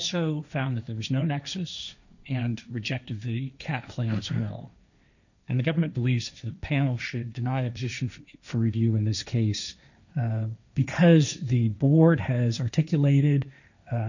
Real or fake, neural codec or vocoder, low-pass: fake; codec, 16 kHz, 1.1 kbps, Voila-Tokenizer; 7.2 kHz